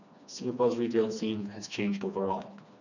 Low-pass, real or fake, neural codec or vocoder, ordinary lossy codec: 7.2 kHz; fake; codec, 16 kHz, 2 kbps, FreqCodec, smaller model; none